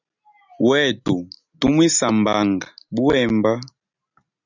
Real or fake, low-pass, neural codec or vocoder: real; 7.2 kHz; none